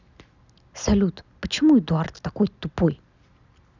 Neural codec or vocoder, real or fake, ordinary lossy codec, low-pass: none; real; none; 7.2 kHz